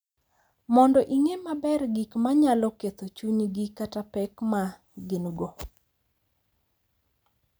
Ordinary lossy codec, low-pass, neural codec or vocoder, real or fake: none; none; none; real